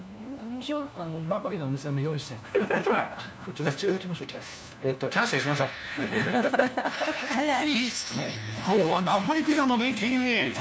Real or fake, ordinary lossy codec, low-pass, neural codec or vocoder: fake; none; none; codec, 16 kHz, 1 kbps, FunCodec, trained on LibriTTS, 50 frames a second